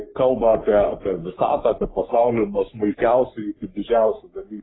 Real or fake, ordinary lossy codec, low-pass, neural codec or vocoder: fake; AAC, 16 kbps; 7.2 kHz; codec, 44.1 kHz, 3.4 kbps, Pupu-Codec